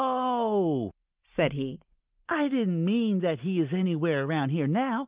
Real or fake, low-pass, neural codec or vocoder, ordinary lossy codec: real; 3.6 kHz; none; Opus, 32 kbps